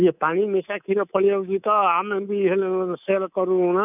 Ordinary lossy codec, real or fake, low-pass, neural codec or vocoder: none; fake; 3.6 kHz; codec, 24 kHz, 3.1 kbps, DualCodec